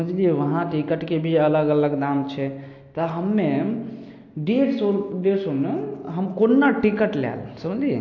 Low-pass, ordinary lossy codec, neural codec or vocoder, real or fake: 7.2 kHz; AAC, 48 kbps; none; real